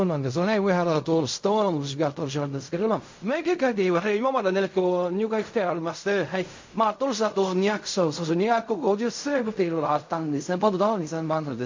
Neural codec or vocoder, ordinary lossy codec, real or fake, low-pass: codec, 16 kHz in and 24 kHz out, 0.4 kbps, LongCat-Audio-Codec, fine tuned four codebook decoder; MP3, 48 kbps; fake; 7.2 kHz